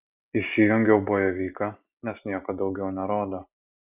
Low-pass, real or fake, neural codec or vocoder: 3.6 kHz; real; none